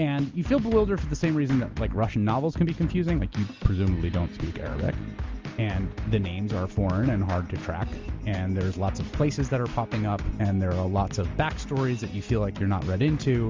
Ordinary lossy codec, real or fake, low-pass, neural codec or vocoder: Opus, 32 kbps; real; 7.2 kHz; none